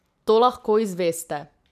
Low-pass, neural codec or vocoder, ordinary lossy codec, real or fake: 14.4 kHz; vocoder, 44.1 kHz, 128 mel bands every 512 samples, BigVGAN v2; none; fake